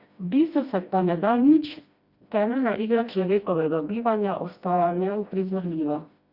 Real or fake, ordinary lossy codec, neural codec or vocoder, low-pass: fake; Opus, 64 kbps; codec, 16 kHz, 1 kbps, FreqCodec, smaller model; 5.4 kHz